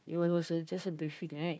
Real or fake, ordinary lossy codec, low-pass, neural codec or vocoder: fake; none; none; codec, 16 kHz, 1 kbps, FunCodec, trained on Chinese and English, 50 frames a second